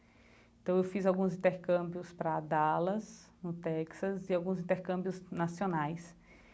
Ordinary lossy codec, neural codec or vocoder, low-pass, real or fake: none; none; none; real